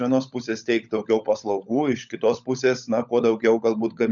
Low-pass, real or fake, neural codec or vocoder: 7.2 kHz; fake; codec, 16 kHz, 16 kbps, FunCodec, trained on LibriTTS, 50 frames a second